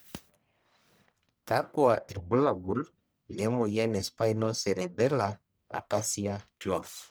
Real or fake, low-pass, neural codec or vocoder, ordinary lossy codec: fake; none; codec, 44.1 kHz, 1.7 kbps, Pupu-Codec; none